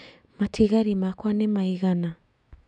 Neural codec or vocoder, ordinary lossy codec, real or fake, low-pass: autoencoder, 48 kHz, 128 numbers a frame, DAC-VAE, trained on Japanese speech; none; fake; 10.8 kHz